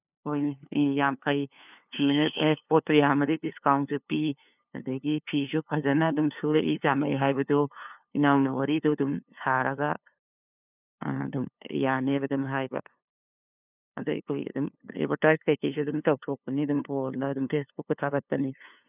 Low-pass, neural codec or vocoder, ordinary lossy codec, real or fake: 3.6 kHz; codec, 16 kHz, 2 kbps, FunCodec, trained on LibriTTS, 25 frames a second; none; fake